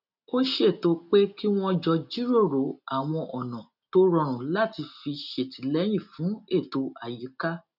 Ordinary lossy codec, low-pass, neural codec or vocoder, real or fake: none; 5.4 kHz; none; real